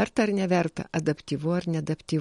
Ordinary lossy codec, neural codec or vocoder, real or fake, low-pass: MP3, 48 kbps; none; real; 19.8 kHz